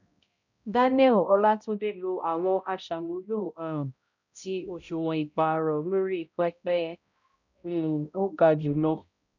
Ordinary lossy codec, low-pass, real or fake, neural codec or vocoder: none; 7.2 kHz; fake; codec, 16 kHz, 0.5 kbps, X-Codec, HuBERT features, trained on balanced general audio